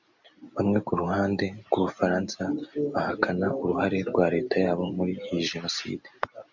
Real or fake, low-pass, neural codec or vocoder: real; 7.2 kHz; none